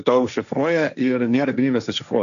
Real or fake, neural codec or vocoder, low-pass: fake; codec, 16 kHz, 1.1 kbps, Voila-Tokenizer; 7.2 kHz